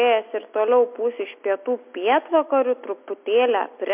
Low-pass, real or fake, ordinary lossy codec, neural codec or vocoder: 3.6 kHz; real; MP3, 32 kbps; none